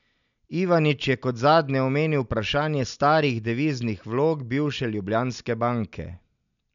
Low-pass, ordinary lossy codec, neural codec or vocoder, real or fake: 7.2 kHz; none; none; real